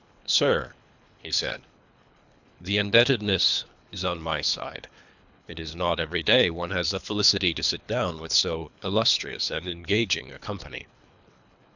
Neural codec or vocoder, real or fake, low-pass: codec, 24 kHz, 3 kbps, HILCodec; fake; 7.2 kHz